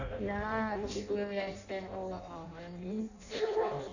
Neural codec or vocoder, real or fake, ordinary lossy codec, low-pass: codec, 16 kHz in and 24 kHz out, 0.6 kbps, FireRedTTS-2 codec; fake; none; 7.2 kHz